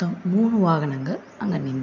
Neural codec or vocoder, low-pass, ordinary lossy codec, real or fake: none; 7.2 kHz; none; real